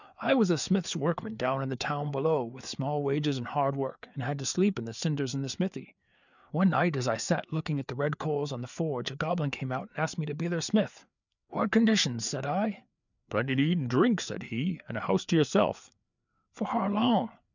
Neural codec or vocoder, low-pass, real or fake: codec, 16 kHz, 4 kbps, FreqCodec, larger model; 7.2 kHz; fake